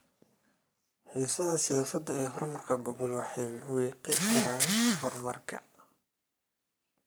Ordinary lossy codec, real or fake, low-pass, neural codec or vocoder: none; fake; none; codec, 44.1 kHz, 3.4 kbps, Pupu-Codec